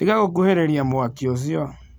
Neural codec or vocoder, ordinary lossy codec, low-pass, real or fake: none; none; none; real